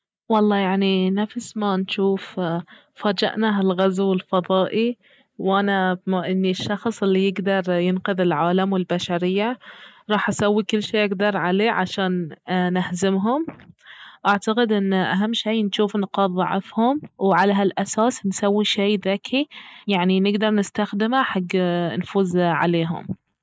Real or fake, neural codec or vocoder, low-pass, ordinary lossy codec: real; none; none; none